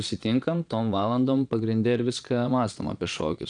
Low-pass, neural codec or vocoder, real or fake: 9.9 kHz; vocoder, 22.05 kHz, 80 mel bands, Vocos; fake